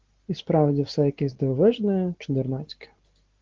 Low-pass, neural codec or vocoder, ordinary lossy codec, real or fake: 7.2 kHz; none; Opus, 16 kbps; real